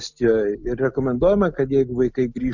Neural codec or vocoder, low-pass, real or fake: none; 7.2 kHz; real